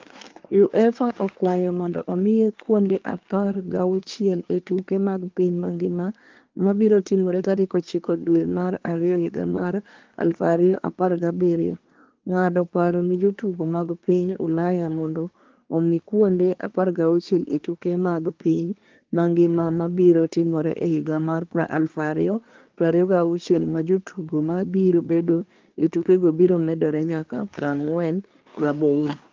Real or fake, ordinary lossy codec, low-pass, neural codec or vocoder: fake; Opus, 24 kbps; 7.2 kHz; codec, 24 kHz, 1 kbps, SNAC